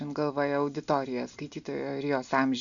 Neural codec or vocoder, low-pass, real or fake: none; 7.2 kHz; real